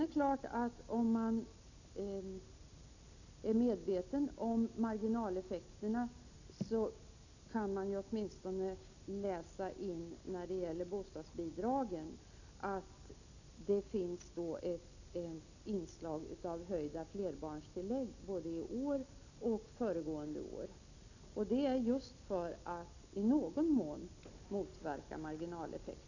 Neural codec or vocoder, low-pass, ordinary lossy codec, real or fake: none; 7.2 kHz; none; real